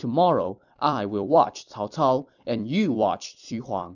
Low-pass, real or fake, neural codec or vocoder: 7.2 kHz; fake; vocoder, 44.1 kHz, 128 mel bands every 256 samples, BigVGAN v2